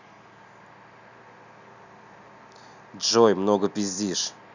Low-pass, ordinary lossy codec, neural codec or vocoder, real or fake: 7.2 kHz; none; none; real